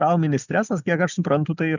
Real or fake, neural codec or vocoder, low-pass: real; none; 7.2 kHz